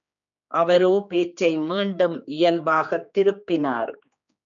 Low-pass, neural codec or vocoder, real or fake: 7.2 kHz; codec, 16 kHz, 2 kbps, X-Codec, HuBERT features, trained on general audio; fake